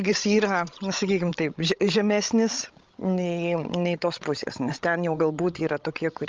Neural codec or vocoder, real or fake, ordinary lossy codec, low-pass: codec, 16 kHz, 16 kbps, FreqCodec, larger model; fake; Opus, 32 kbps; 7.2 kHz